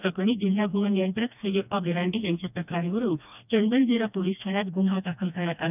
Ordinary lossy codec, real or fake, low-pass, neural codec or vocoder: none; fake; 3.6 kHz; codec, 16 kHz, 1 kbps, FreqCodec, smaller model